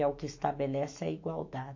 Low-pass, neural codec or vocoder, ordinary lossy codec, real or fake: 7.2 kHz; autoencoder, 48 kHz, 128 numbers a frame, DAC-VAE, trained on Japanese speech; MP3, 48 kbps; fake